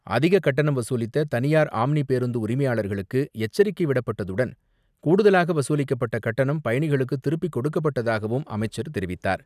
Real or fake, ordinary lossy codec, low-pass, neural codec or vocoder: real; none; 14.4 kHz; none